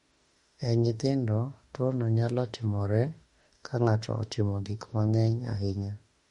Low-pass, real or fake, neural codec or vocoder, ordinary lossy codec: 19.8 kHz; fake; autoencoder, 48 kHz, 32 numbers a frame, DAC-VAE, trained on Japanese speech; MP3, 48 kbps